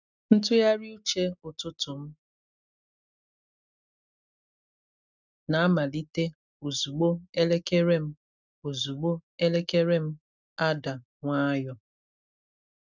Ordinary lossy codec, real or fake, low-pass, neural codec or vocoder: none; real; 7.2 kHz; none